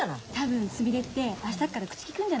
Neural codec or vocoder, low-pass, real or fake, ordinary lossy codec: none; none; real; none